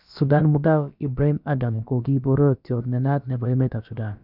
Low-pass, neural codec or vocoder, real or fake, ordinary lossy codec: 5.4 kHz; codec, 16 kHz, about 1 kbps, DyCAST, with the encoder's durations; fake; none